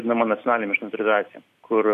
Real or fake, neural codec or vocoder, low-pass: real; none; 14.4 kHz